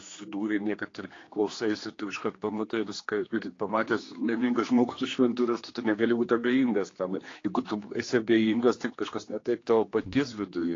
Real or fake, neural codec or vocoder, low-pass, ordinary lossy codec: fake; codec, 16 kHz, 2 kbps, X-Codec, HuBERT features, trained on general audio; 7.2 kHz; AAC, 32 kbps